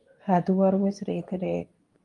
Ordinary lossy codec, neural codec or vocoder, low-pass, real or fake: Opus, 32 kbps; codec, 24 kHz, 0.9 kbps, WavTokenizer, medium speech release version 2; 10.8 kHz; fake